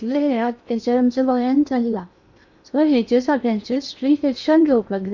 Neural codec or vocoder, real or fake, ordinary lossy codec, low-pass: codec, 16 kHz in and 24 kHz out, 0.8 kbps, FocalCodec, streaming, 65536 codes; fake; none; 7.2 kHz